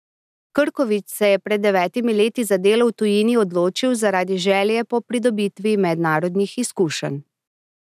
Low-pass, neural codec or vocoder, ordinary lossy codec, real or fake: 14.4 kHz; none; none; real